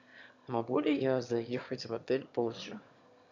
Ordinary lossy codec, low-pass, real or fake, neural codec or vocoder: MP3, 64 kbps; 7.2 kHz; fake; autoencoder, 22.05 kHz, a latent of 192 numbers a frame, VITS, trained on one speaker